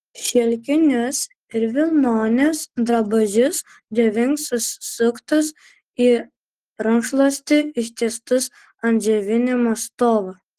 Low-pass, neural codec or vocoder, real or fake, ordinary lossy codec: 14.4 kHz; none; real; Opus, 16 kbps